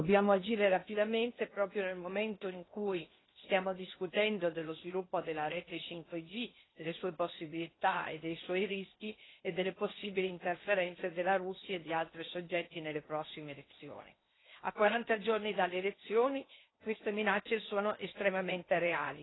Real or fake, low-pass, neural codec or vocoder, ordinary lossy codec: fake; 7.2 kHz; codec, 16 kHz in and 24 kHz out, 0.8 kbps, FocalCodec, streaming, 65536 codes; AAC, 16 kbps